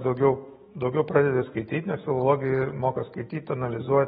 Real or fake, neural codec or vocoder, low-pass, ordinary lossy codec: fake; autoencoder, 48 kHz, 128 numbers a frame, DAC-VAE, trained on Japanese speech; 19.8 kHz; AAC, 16 kbps